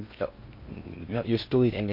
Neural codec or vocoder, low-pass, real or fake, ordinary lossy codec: codec, 16 kHz in and 24 kHz out, 0.6 kbps, FocalCodec, streaming, 4096 codes; 5.4 kHz; fake; MP3, 32 kbps